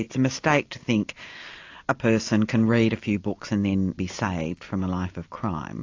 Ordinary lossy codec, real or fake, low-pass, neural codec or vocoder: AAC, 48 kbps; real; 7.2 kHz; none